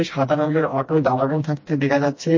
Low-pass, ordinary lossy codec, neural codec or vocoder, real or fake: 7.2 kHz; MP3, 32 kbps; codec, 16 kHz, 1 kbps, FreqCodec, smaller model; fake